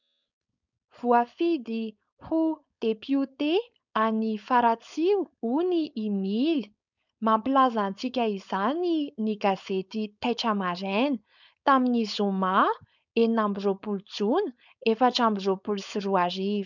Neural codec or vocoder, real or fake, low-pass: codec, 16 kHz, 4.8 kbps, FACodec; fake; 7.2 kHz